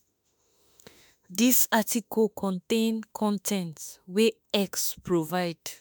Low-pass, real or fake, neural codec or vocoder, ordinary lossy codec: none; fake; autoencoder, 48 kHz, 32 numbers a frame, DAC-VAE, trained on Japanese speech; none